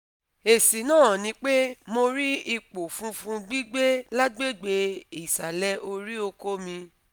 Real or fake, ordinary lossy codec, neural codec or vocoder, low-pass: real; none; none; none